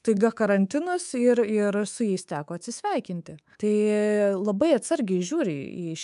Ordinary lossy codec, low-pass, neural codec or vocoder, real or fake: MP3, 96 kbps; 10.8 kHz; codec, 24 kHz, 3.1 kbps, DualCodec; fake